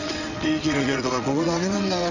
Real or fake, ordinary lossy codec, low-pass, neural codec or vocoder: fake; none; 7.2 kHz; vocoder, 22.05 kHz, 80 mel bands, WaveNeXt